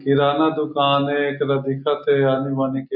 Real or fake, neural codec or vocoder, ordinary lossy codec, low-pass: real; none; MP3, 48 kbps; 5.4 kHz